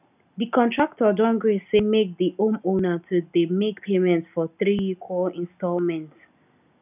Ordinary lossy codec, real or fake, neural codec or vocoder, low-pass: none; real; none; 3.6 kHz